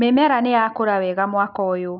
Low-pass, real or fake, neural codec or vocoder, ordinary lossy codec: 5.4 kHz; real; none; none